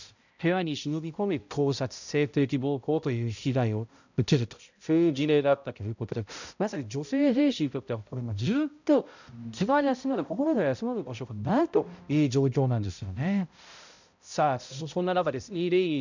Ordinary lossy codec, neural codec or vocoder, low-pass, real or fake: none; codec, 16 kHz, 0.5 kbps, X-Codec, HuBERT features, trained on balanced general audio; 7.2 kHz; fake